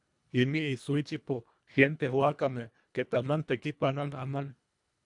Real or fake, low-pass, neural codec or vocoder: fake; 10.8 kHz; codec, 24 kHz, 1.5 kbps, HILCodec